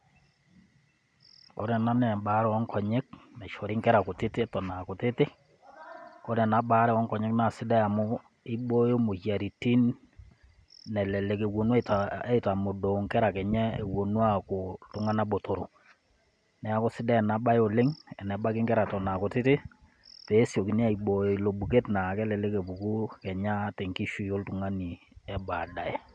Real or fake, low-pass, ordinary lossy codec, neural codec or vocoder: real; 9.9 kHz; none; none